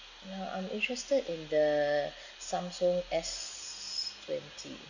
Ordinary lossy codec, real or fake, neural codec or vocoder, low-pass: none; real; none; 7.2 kHz